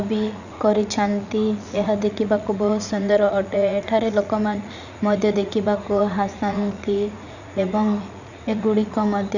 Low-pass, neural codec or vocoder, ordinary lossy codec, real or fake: 7.2 kHz; vocoder, 44.1 kHz, 80 mel bands, Vocos; none; fake